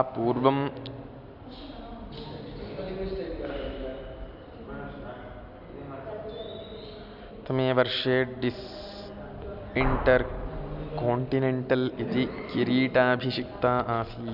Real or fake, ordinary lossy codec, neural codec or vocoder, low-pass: real; none; none; 5.4 kHz